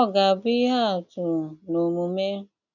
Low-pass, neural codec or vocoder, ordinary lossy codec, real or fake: 7.2 kHz; none; none; real